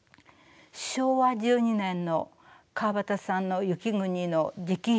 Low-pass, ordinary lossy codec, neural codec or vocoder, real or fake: none; none; none; real